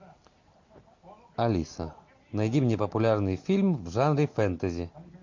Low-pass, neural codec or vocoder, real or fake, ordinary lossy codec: 7.2 kHz; none; real; MP3, 48 kbps